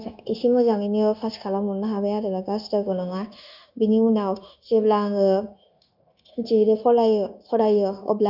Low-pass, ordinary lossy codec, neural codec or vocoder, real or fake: 5.4 kHz; none; codec, 16 kHz, 0.9 kbps, LongCat-Audio-Codec; fake